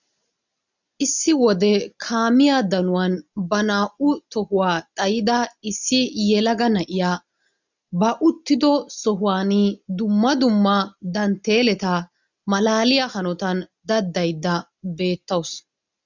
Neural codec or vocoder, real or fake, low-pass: none; real; 7.2 kHz